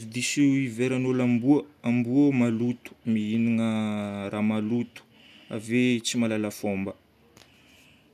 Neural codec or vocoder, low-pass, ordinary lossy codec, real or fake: none; 14.4 kHz; none; real